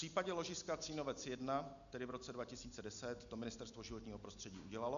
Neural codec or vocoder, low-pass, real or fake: none; 7.2 kHz; real